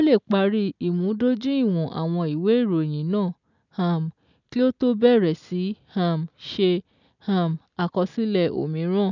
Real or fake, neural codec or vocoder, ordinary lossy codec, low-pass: real; none; none; 7.2 kHz